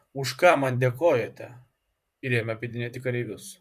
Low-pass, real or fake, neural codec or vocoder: 14.4 kHz; fake; vocoder, 44.1 kHz, 128 mel bands, Pupu-Vocoder